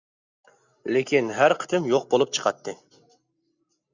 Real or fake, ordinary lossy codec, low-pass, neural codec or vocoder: real; Opus, 64 kbps; 7.2 kHz; none